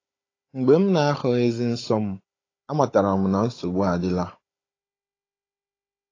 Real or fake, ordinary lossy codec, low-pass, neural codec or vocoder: fake; AAC, 32 kbps; 7.2 kHz; codec, 16 kHz, 16 kbps, FunCodec, trained on Chinese and English, 50 frames a second